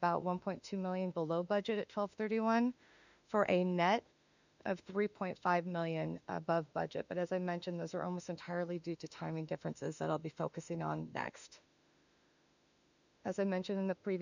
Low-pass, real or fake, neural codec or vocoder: 7.2 kHz; fake; autoencoder, 48 kHz, 32 numbers a frame, DAC-VAE, trained on Japanese speech